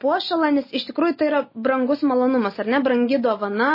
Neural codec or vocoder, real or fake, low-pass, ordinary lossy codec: none; real; 5.4 kHz; MP3, 24 kbps